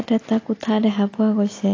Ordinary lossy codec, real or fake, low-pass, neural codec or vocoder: AAC, 32 kbps; real; 7.2 kHz; none